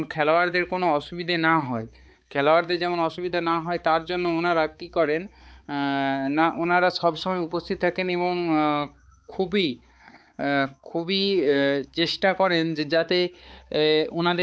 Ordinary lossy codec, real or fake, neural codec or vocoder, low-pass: none; fake; codec, 16 kHz, 4 kbps, X-Codec, HuBERT features, trained on balanced general audio; none